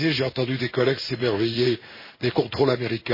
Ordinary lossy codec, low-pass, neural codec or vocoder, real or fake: MP3, 24 kbps; 5.4 kHz; codec, 16 kHz in and 24 kHz out, 1 kbps, XY-Tokenizer; fake